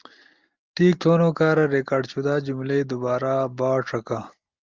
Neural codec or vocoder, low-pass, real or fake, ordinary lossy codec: none; 7.2 kHz; real; Opus, 32 kbps